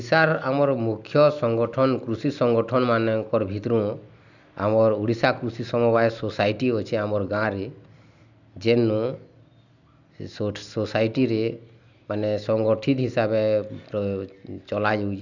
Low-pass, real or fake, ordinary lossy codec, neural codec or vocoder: 7.2 kHz; real; none; none